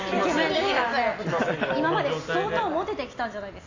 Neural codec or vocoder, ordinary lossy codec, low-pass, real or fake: none; AAC, 32 kbps; 7.2 kHz; real